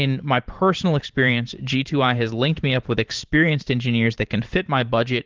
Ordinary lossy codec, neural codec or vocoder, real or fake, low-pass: Opus, 16 kbps; vocoder, 44.1 kHz, 128 mel bands every 512 samples, BigVGAN v2; fake; 7.2 kHz